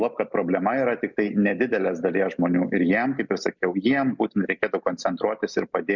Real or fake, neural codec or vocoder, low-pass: real; none; 7.2 kHz